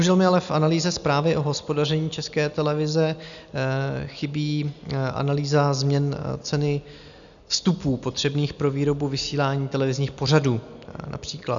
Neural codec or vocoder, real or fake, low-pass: none; real; 7.2 kHz